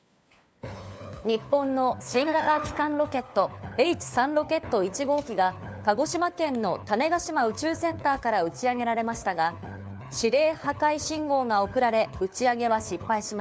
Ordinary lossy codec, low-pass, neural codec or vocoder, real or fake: none; none; codec, 16 kHz, 4 kbps, FunCodec, trained on LibriTTS, 50 frames a second; fake